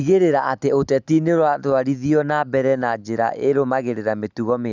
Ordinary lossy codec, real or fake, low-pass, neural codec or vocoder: none; real; 7.2 kHz; none